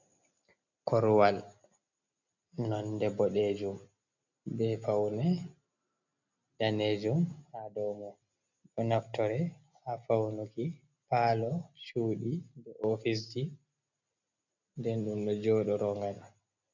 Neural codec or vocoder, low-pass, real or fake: none; 7.2 kHz; real